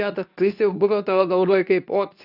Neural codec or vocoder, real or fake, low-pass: codec, 24 kHz, 0.9 kbps, WavTokenizer, medium speech release version 2; fake; 5.4 kHz